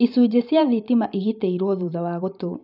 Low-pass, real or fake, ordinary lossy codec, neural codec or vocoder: 5.4 kHz; fake; none; vocoder, 44.1 kHz, 128 mel bands every 512 samples, BigVGAN v2